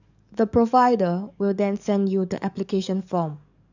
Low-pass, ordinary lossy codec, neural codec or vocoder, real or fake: 7.2 kHz; none; codec, 44.1 kHz, 7.8 kbps, DAC; fake